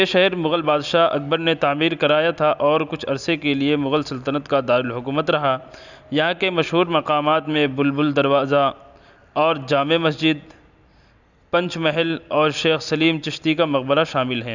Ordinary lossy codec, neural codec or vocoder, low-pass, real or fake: none; none; 7.2 kHz; real